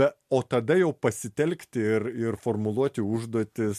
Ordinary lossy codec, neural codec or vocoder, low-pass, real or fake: MP3, 64 kbps; none; 14.4 kHz; real